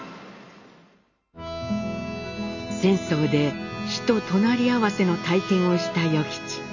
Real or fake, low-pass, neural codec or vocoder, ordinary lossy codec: real; 7.2 kHz; none; none